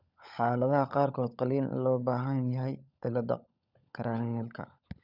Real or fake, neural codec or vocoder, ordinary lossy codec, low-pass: fake; codec, 16 kHz, 16 kbps, FunCodec, trained on LibriTTS, 50 frames a second; none; 5.4 kHz